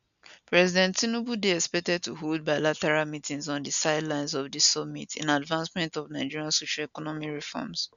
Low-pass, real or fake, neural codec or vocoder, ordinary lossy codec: 7.2 kHz; real; none; none